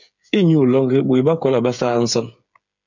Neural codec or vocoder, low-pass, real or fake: codec, 16 kHz, 8 kbps, FreqCodec, smaller model; 7.2 kHz; fake